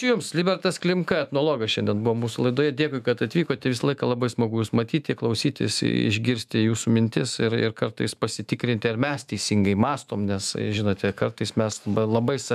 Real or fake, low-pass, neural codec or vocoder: fake; 14.4 kHz; autoencoder, 48 kHz, 128 numbers a frame, DAC-VAE, trained on Japanese speech